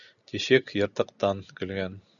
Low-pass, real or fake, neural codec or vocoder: 7.2 kHz; real; none